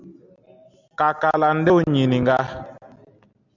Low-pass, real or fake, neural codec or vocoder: 7.2 kHz; real; none